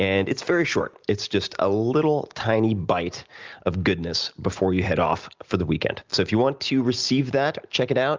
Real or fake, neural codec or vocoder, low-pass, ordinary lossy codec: real; none; 7.2 kHz; Opus, 24 kbps